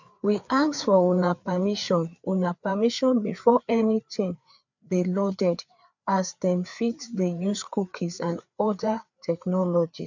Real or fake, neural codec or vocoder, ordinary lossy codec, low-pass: fake; codec, 16 kHz, 4 kbps, FreqCodec, larger model; none; 7.2 kHz